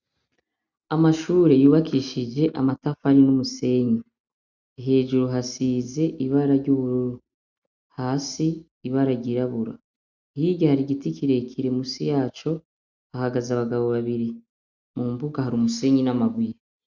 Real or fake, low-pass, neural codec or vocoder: real; 7.2 kHz; none